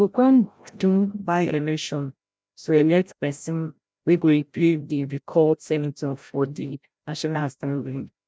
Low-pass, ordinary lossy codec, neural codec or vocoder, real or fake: none; none; codec, 16 kHz, 0.5 kbps, FreqCodec, larger model; fake